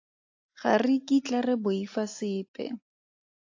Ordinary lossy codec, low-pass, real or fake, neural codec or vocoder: AAC, 48 kbps; 7.2 kHz; real; none